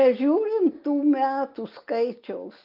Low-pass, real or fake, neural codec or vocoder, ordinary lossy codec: 5.4 kHz; real; none; Opus, 24 kbps